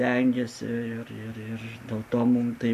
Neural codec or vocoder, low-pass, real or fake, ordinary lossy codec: none; 14.4 kHz; real; MP3, 96 kbps